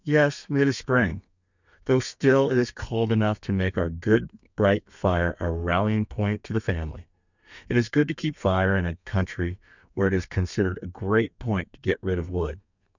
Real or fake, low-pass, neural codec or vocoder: fake; 7.2 kHz; codec, 32 kHz, 1.9 kbps, SNAC